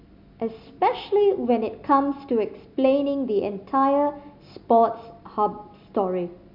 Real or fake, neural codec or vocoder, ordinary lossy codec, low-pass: real; none; MP3, 48 kbps; 5.4 kHz